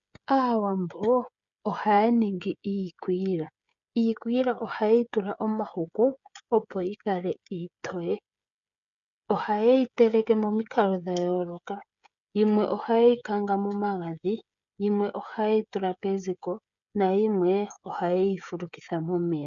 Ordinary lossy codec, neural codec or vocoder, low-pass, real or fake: MP3, 96 kbps; codec, 16 kHz, 16 kbps, FreqCodec, smaller model; 7.2 kHz; fake